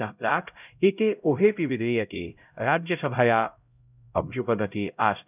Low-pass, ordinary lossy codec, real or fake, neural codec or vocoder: 3.6 kHz; none; fake; codec, 16 kHz, 0.5 kbps, X-Codec, HuBERT features, trained on LibriSpeech